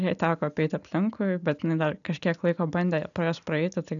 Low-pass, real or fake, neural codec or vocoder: 7.2 kHz; real; none